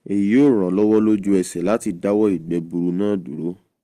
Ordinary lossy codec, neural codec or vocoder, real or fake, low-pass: Opus, 24 kbps; none; real; 14.4 kHz